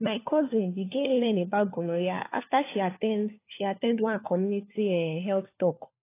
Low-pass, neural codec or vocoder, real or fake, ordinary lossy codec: 3.6 kHz; codec, 16 kHz, 4 kbps, FunCodec, trained on LibriTTS, 50 frames a second; fake; AAC, 24 kbps